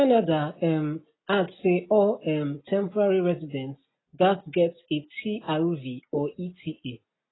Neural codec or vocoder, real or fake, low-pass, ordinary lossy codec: none; real; 7.2 kHz; AAC, 16 kbps